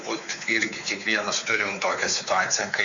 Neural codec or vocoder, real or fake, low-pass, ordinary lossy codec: codec, 16 kHz, 4 kbps, X-Codec, WavLM features, trained on Multilingual LibriSpeech; fake; 7.2 kHz; Opus, 64 kbps